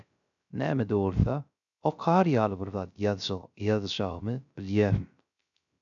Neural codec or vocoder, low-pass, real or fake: codec, 16 kHz, 0.3 kbps, FocalCodec; 7.2 kHz; fake